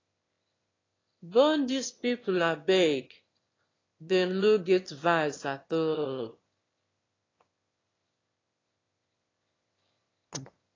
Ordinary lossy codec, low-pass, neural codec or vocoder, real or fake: AAC, 32 kbps; 7.2 kHz; autoencoder, 22.05 kHz, a latent of 192 numbers a frame, VITS, trained on one speaker; fake